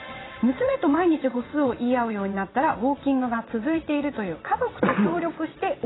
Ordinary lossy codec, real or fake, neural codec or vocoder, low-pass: AAC, 16 kbps; fake; vocoder, 22.05 kHz, 80 mel bands, WaveNeXt; 7.2 kHz